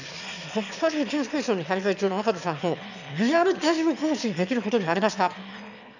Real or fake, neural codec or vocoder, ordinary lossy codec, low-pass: fake; autoencoder, 22.05 kHz, a latent of 192 numbers a frame, VITS, trained on one speaker; none; 7.2 kHz